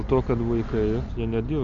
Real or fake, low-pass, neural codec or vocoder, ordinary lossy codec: real; 7.2 kHz; none; MP3, 64 kbps